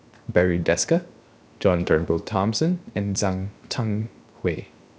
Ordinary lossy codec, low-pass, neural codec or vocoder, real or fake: none; none; codec, 16 kHz, 0.7 kbps, FocalCodec; fake